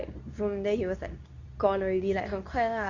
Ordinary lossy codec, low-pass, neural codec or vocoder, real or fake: Opus, 64 kbps; 7.2 kHz; codec, 24 kHz, 0.9 kbps, WavTokenizer, medium speech release version 2; fake